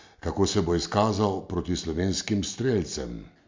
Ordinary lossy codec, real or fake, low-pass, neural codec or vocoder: MP3, 48 kbps; real; 7.2 kHz; none